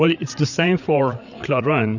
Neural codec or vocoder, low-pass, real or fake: codec, 16 kHz, 8 kbps, FreqCodec, larger model; 7.2 kHz; fake